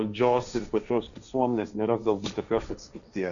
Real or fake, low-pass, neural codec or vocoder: fake; 7.2 kHz; codec, 16 kHz, 1.1 kbps, Voila-Tokenizer